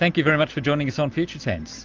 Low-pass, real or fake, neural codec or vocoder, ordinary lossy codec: 7.2 kHz; real; none; Opus, 24 kbps